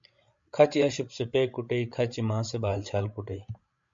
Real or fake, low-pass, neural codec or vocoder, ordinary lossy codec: fake; 7.2 kHz; codec, 16 kHz, 16 kbps, FreqCodec, larger model; MP3, 48 kbps